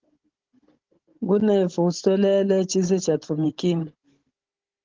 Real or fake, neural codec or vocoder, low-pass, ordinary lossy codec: fake; codec, 16 kHz, 16 kbps, FunCodec, trained on Chinese and English, 50 frames a second; 7.2 kHz; Opus, 16 kbps